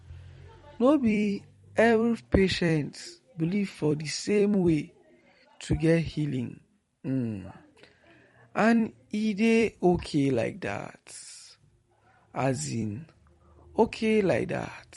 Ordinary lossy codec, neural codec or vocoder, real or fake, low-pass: MP3, 48 kbps; vocoder, 44.1 kHz, 128 mel bands every 256 samples, BigVGAN v2; fake; 19.8 kHz